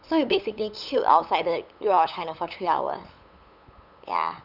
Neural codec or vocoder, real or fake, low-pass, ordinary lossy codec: codec, 16 kHz, 8 kbps, FunCodec, trained on LibriTTS, 25 frames a second; fake; 5.4 kHz; none